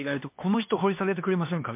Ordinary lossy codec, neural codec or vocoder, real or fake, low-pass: MP3, 32 kbps; codec, 16 kHz, 2 kbps, X-Codec, HuBERT features, trained on LibriSpeech; fake; 3.6 kHz